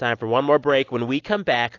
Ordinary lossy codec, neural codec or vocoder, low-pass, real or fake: AAC, 48 kbps; none; 7.2 kHz; real